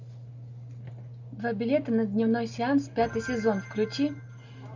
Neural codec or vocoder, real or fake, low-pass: none; real; 7.2 kHz